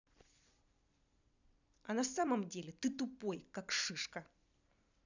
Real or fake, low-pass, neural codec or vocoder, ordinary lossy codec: fake; 7.2 kHz; vocoder, 44.1 kHz, 128 mel bands every 256 samples, BigVGAN v2; none